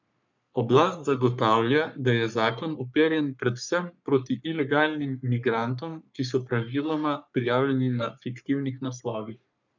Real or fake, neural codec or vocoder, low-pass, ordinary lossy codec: fake; codec, 44.1 kHz, 3.4 kbps, Pupu-Codec; 7.2 kHz; none